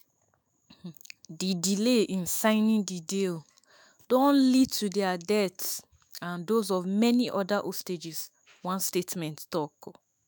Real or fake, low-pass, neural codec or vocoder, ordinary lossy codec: fake; none; autoencoder, 48 kHz, 128 numbers a frame, DAC-VAE, trained on Japanese speech; none